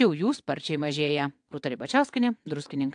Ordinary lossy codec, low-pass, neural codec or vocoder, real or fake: AAC, 64 kbps; 9.9 kHz; vocoder, 22.05 kHz, 80 mel bands, WaveNeXt; fake